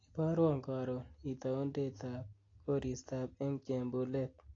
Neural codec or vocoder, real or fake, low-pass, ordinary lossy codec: none; real; 7.2 kHz; AAC, 32 kbps